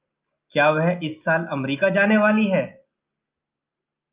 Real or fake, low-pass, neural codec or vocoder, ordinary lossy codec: real; 3.6 kHz; none; Opus, 24 kbps